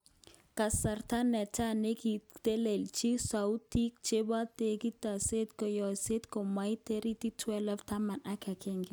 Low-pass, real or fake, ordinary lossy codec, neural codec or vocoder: none; real; none; none